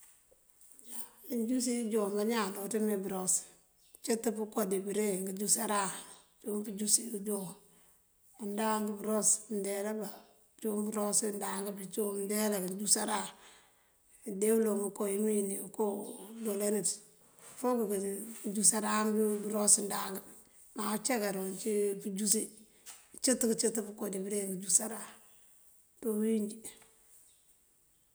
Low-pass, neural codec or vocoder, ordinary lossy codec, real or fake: none; none; none; real